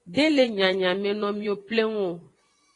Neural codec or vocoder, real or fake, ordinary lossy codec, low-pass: vocoder, 24 kHz, 100 mel bands, Vocos; fake; AAC, 32 kbps; 10.8 kHz